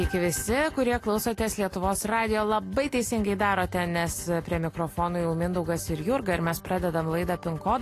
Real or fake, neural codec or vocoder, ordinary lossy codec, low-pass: real; none; AAC, 48 kbps; 14.4 kHz